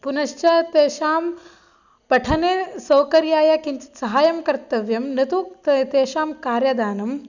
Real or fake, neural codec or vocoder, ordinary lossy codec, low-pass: real; none; none; 7.2 kHz